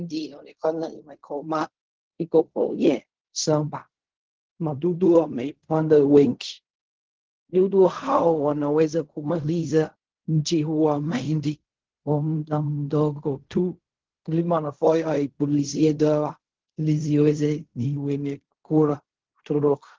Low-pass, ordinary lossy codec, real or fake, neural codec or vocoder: 7.2 kHz; Opus, 16 kbps; fake; codec, 16 kHz in and 24 kHz out, 0.4 kbps, LongCat-Audio-Codec, fine tuned four codebook decoder